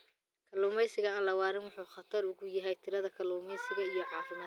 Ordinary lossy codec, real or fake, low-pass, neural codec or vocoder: Opus, 32 kbps; real; 19.8 kHz; none